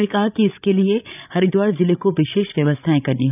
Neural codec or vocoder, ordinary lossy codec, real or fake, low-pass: codec, 16 kHz, 16 kbps, FreqCodec, larger model; none; fake; 3.6 kHz